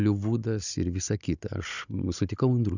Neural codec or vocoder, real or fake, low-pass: codec, 16 kHz, 16 kbps, FunCodec, trained on Chinese and English, 50 frames a second; fake; 7.2 kHz